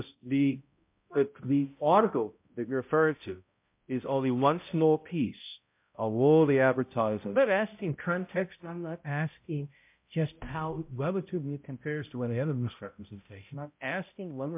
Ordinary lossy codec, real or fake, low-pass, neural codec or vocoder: MP3, 32 kbps; fake; 3.6 kHz; codec, 16 kHz, 0.5 kbps, X-Codec, HuBERT features, trained on balanced general audio